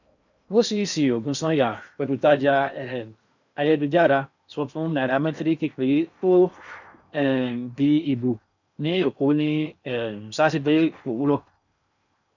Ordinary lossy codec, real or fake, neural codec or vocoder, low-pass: none; fake; codec, 16 kHz in and 24 kHz out, 0.8 kbps, FocalCodec, streaming, 65536 codes; 7.2 kHz